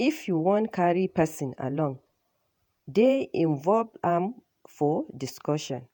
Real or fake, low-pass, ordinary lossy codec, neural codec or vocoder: fake; 19.8 kHz; MP3, 96 kbps; vocoder, 48 kHz, 128 mel bands, Vocos